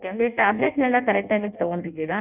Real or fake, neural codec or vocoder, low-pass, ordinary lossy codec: fake; codec, 16 kHz in and 24 kHz out, 0.6 kbps, FireRedTTS-2 codec; 3.6 kHz; none